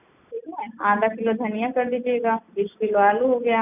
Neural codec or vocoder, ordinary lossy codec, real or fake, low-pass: none; none; real; 3.6 kHz